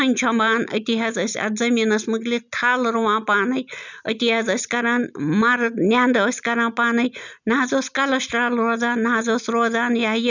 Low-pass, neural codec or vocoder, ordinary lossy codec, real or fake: 7.2 kHz; none; none; real